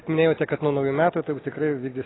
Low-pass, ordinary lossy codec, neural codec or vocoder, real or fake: 7.2 kHz; AAC, 16 kbps; none; real